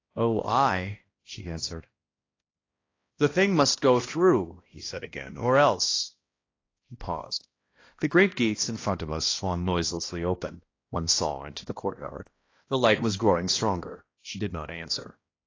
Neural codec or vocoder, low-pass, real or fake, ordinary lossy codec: codec, 16 kHz, 1 kbps, X-Codec, HuBERT features, trained on balanced general audio; 7.2 kHz; fake; AAC, 32 kbps